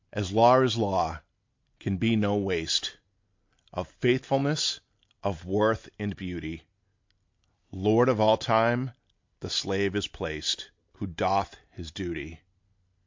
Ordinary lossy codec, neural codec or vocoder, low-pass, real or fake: MP3, 48 kbps; none; 7.2 kHz; real